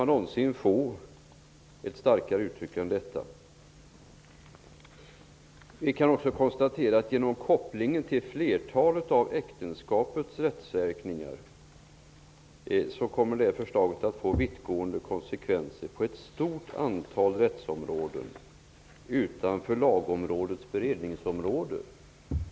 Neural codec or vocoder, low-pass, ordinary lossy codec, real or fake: none; none; none; real